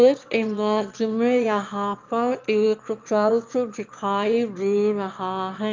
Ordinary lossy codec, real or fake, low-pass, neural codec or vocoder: Opus, 24 kbps; fake; 7.2 kHz; autoencoder, 22.05 kHz, a latent of 192 numbers a frame, VITS, trained on one speaker